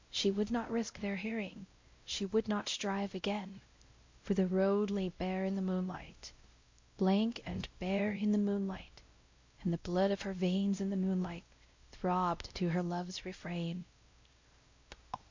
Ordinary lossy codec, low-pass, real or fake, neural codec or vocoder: MP3, 48 kbps; 7.2 kHz; fake; codec, 16 kHz, 0.5 kbps, X-Codec, WavLM features, trained on Multilingual LibriSpeech